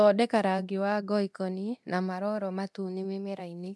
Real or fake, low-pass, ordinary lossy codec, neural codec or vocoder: fake; none; none; codec, 24 kHz, 0.9 kbps, DualCodec